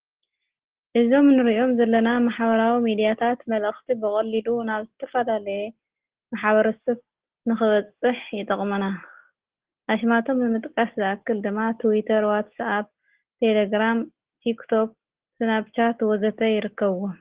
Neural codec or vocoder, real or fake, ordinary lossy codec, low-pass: none; real; Opus, 16 kbps; 3.6 kHz